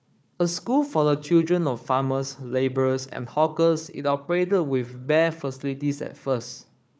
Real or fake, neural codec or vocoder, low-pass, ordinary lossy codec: fake; codec, 16 kHz, 4 kbps, FunCodec, trained on Chinese and English, 50 frames a second; none; none